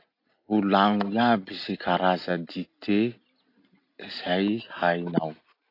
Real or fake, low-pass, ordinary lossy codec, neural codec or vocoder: real; 5.4 kHz; AAC, 32 kbps; none